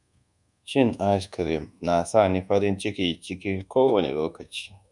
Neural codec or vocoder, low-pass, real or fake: codec, 24 kHz, 1.2 kbps, DualCodec; 10.8 kHz; fake